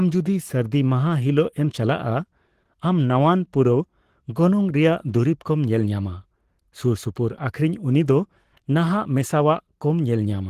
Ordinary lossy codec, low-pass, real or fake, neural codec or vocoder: Opus, 16 kbps; 14.4 kHz; fake; codec, 44.1 kHz, 7.8 kbps, Pupu-Codec